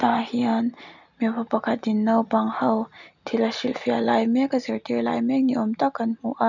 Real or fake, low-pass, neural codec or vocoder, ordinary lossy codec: real; 7.2 kHz; none; none